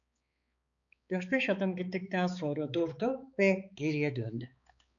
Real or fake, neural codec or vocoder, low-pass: fake; codec, 16 kHz, 4 kbps, X-Codec, HuBERT features, trained on balanced general audio; 7.2 kHz